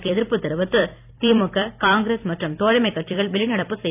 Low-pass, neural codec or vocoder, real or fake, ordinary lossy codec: 3.6 kHz; vocoder, 44.1 kHz, 128 mel bands every 256 samples, BigVGAN v2; fake; AAC, 32 kbps